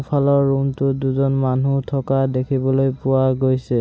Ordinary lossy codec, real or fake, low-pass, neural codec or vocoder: none; real; none; none